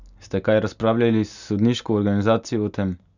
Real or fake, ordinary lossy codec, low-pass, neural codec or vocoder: real; none; 7.2 kHz; none